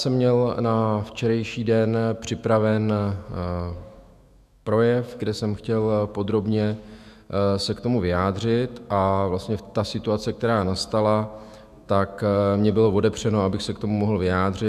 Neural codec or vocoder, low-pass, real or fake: autoencoder, 48 kHz, 128 numbers a frame, DAC-VAE, trained on Japanese speech; 14.4 kHz; fake